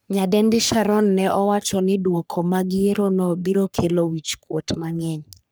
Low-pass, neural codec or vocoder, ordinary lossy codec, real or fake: none; codec, 44.1 kHz, 3.4 kbps, Pupu-Codec; none; fake